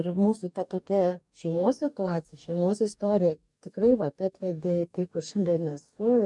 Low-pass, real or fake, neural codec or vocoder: 10.8 kHz; fake; codec, 44.1 kHz, 2.6 kbps, DAC